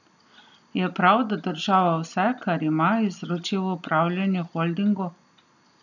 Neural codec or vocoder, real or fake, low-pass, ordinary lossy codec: none; real; 7.2 kHz; none